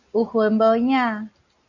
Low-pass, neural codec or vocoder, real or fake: 7.2 kHz; none; real